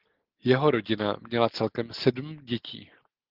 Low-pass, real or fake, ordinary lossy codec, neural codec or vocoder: 5.4 kHz; real; Opus, 16 kbps; none